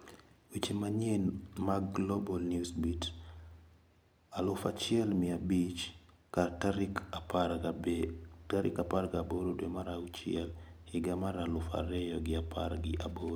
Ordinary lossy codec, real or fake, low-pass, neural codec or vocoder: none; real; none; none